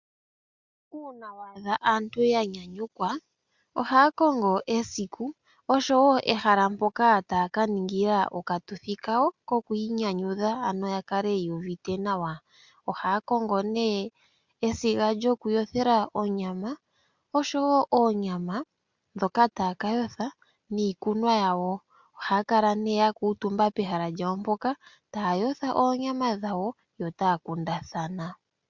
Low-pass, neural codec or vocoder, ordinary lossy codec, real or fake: 7.2 kHz; none; Opus, 64 kbps; real